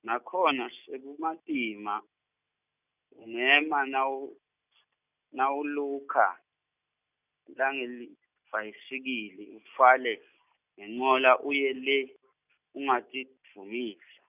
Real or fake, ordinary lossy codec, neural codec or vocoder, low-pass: real; none; none; 3.6 kHz